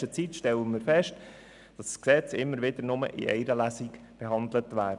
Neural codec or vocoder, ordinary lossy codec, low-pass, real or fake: none; none; 10.8 kHz; real